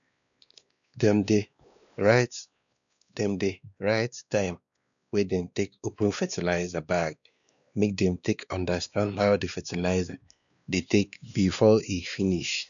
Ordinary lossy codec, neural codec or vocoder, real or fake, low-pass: none; codec, 16 kHz, 2 kbps, X-Codec, WavLM features, trained on Multilingual LibriSpeech; fake; 7.2 kHz